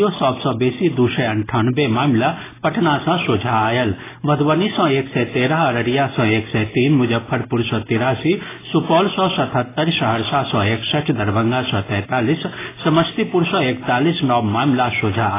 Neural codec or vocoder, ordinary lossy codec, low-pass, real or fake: none; AAC, 16 kbps; 3.6 kHz; real